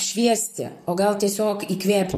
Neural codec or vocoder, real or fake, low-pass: vocoder, 44.1 kHz, 128 mel bands, Pupu-Vocoder; fake; 14.4 kHz